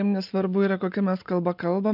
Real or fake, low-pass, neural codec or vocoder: real; 5.4 kHz; none